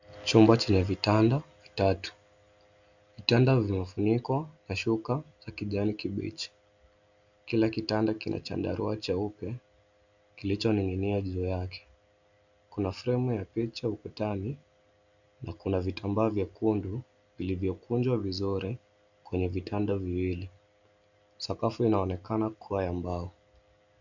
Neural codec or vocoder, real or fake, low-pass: none; real; 7.2 kHz